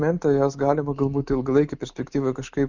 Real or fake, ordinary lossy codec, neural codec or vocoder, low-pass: real; Opus, 64 kbps; none; 7.2 kHz